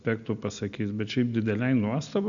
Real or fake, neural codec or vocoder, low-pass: real; none; 7.2 kHz